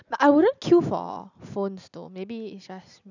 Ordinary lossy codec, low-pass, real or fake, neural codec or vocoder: none; 7.2 kHz; real; none